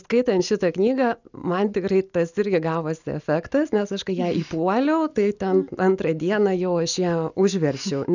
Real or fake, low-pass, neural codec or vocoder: real; 7.2 kHz; none